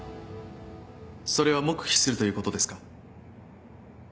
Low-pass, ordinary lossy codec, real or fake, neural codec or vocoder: none; none; real; none